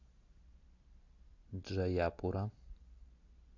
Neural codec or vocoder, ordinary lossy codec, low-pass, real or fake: none; MP3, 48 kbps; 7.2 kHz; real